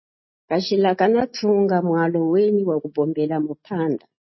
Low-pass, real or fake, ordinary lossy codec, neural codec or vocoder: 7.2 kHz; real; MP3, 24 kbps; none